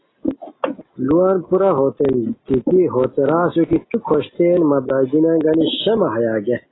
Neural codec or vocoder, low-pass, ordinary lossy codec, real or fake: none; 7.2 kHz; AAC, 16 kbps; real